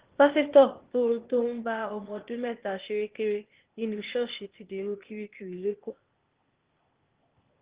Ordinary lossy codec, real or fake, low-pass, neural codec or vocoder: Opus, 16 kbps; fake; 3.6 kHz; codec, 16 kHz, 0.8 kbps, ZipCodec